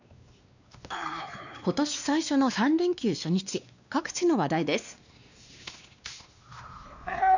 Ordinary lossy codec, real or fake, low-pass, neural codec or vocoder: none; fake; 7.2 kHz; codec, 16 kHz, 2 kbps, X-Codec, WavLM features, trained on Multilingual LibriSpeech